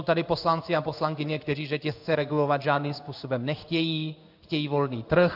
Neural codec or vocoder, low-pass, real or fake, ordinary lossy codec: codec, 16 kHz in and 24 kHz out, 1 kbps, XY-Tokenizer; 5.4 kHz; fake; AAC, 48 kbps